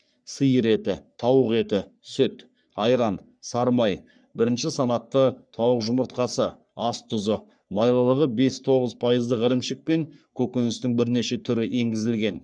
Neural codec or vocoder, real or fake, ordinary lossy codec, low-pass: codec, 44.1 kHz, 3.4 kbps, Pupu-Codec; fake; none; 9.9 kHz